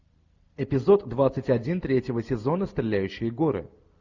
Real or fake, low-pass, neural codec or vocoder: real; 7.2 kHz; none